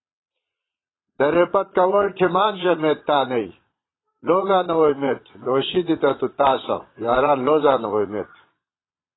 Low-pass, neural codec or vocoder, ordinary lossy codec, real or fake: 7.2 kHz; vocoder, 22.05 kHz, 80 mel bands, Vocos; AAC, 16 kbps; fake